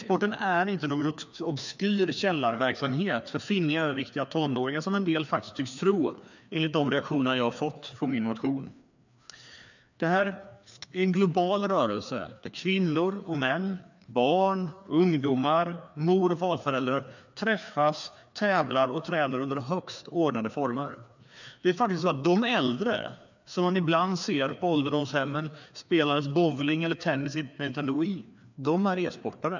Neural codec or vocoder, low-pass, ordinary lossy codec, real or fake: codec, 16 kHz, 2 kbps, FreqCodec, larger model; 7.2 kHz; none; fake